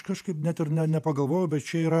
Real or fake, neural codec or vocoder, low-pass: fake; vocoder, 48 kHz, 128 mel bands, Vocos; 14.4 kHz